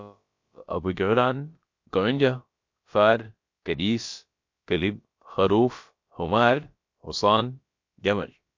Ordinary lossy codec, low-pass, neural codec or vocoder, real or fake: MP3, 48 kbps; 7.2 kHz; codec, 16 kHz, about 1 kbps, DyCAST, with the encoder's durations; fake